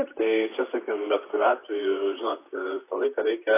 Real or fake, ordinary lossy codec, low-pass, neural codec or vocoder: fake; AAC, 24 kbps; 3.6 kHz; vocoder, 44.1 kHz, 128 mel bands, Pupu-Vocoder